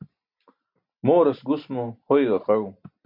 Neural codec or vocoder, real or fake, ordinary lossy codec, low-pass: none; real; MP3, 48 kbps; 5.4 kHz